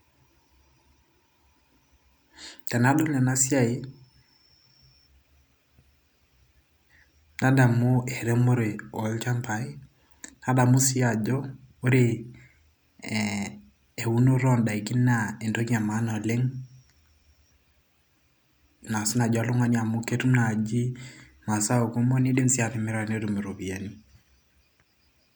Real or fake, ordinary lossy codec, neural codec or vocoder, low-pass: real; none; none; none